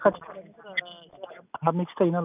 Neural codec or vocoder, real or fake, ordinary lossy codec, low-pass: none; real; none; 3.6 kHz